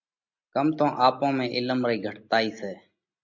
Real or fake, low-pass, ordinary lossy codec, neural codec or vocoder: real; 7.2 kHz; MP3, 48 kbps; none